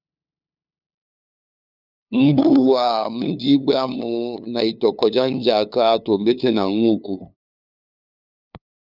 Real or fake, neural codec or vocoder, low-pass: fake; codec, 16 kHz, 2 kbps, FunCodec, trained on LibriTTS, 25 frames a second; 5.4 kHz